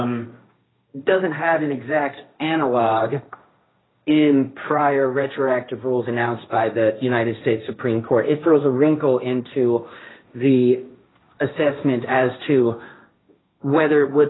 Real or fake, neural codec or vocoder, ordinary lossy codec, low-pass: fake; codec, 16 kHz, 1.1 kbps, Voila-Tokenizer; AAC, 16 kbps; 7.2 kHz